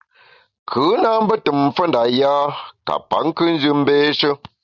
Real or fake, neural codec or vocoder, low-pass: real; none; 7.2 kHz